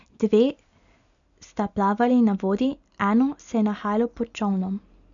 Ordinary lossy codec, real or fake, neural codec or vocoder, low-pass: none; real; none; 7.2 kHz